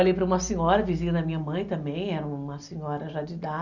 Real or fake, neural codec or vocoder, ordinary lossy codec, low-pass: real; none; none; 7.2 kHz